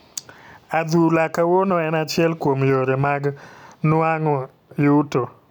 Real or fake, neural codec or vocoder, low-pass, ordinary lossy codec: real; none; 19.8 kHz; none